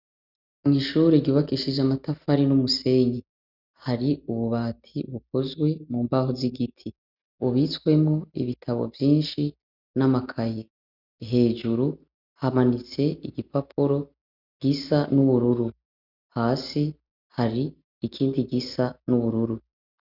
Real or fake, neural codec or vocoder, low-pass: real; none; 5.4 kHz